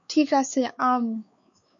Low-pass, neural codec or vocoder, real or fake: 7.2 kHz; codec, 16 kHz, 4 kbps, X-Codec, WavLM features, trained on Multilingual LibriSpeech; fake